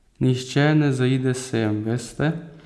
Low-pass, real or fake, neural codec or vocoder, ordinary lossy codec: none; real; none; none